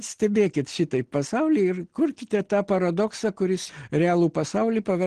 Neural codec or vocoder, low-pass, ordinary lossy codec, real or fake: none; 9.9 kHz; Opus, 16 kbps; real